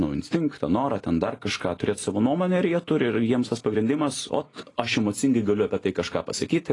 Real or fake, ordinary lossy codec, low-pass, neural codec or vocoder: real; AAC, 32 kbps; 10.8 kHz; none